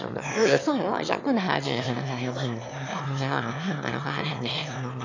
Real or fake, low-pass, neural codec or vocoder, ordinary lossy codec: fake; 7.2 kHz; autoencoder, 22.05 kHz, a latent of 192 numbers a frame, VITS, trained on one speaker; AAC, 48 kbps